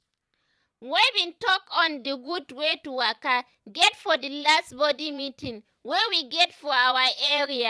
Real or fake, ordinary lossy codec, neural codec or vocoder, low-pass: fake; none; vocoder, 22.05 kHz, 80 mel bands, Vocos; none